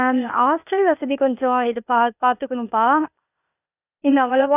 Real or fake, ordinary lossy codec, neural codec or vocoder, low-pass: fake; none; codec, 16 kHz, 0.8 kbps, ZipCodec; 3.6 kHz